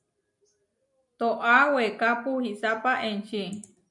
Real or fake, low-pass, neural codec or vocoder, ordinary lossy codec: real; 10.8 kHz; none; MP3, 96 kbps